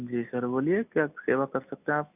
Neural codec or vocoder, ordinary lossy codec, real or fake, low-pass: none; none; real; 3.6 kHz